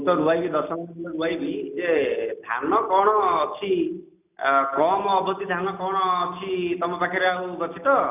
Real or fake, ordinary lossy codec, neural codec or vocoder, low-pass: real; none; none; 3.6 kHz